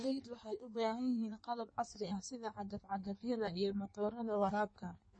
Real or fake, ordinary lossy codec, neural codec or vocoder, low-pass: fake; MP3, 32 kbps; codec, 16 kHz in and 24 kHz out, 1.1 kbps, FireRedTTS-2 codec; 9.9 kHz